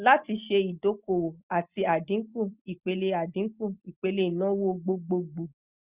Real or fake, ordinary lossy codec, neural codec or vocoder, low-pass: real; Opus, 64 kbps; none; 3.6 kHz